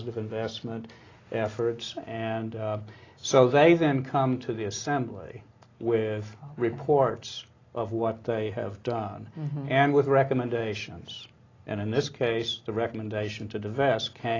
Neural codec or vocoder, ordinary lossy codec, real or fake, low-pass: none; AAC, 32 kbps; real; 7.2 kHz